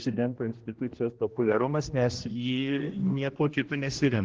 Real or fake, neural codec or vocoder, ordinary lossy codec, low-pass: fake; codec, 16 kHz, 1 kbps, X-Codec, HuBERT features, trained on general audio; Opus, 16 kbps; 7.2 kHz